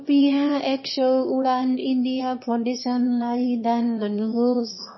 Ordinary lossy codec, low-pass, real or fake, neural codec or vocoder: MP3, 24 kbps; 7.2 kHz; fake; autoencoder, 22.05 kHz, a latent of 192 numbers a frame, VITS, trained on one speaker